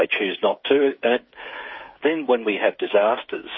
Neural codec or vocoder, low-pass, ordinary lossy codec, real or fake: codec, 16 kHz, 8 kbps, FreqCodec, smaller model; 7.2 kHz; MP3, 24 kbps; fake